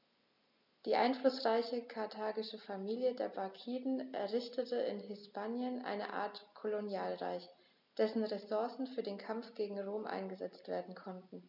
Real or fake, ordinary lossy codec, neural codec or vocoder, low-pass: real; none; none; 5.4 kHz